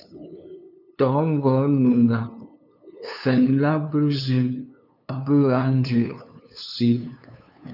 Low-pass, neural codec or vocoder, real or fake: 5.4 kHz; codec, 16 kHz, 2 kbps, FunCodec, trained on LibriTTS, 25 frames a second; fake